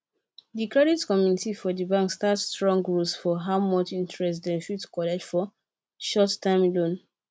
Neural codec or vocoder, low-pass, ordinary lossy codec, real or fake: none; none; none; real